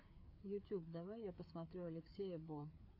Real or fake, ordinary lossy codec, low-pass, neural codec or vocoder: fake; AAC, 32 kbps; 5.4 kHz; codec, 16 kHz, 4 kbps, FreqCodec, larger model